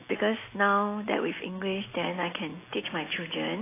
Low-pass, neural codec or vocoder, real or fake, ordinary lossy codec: 3.6 kHz; none; real; AAC, 16 kbps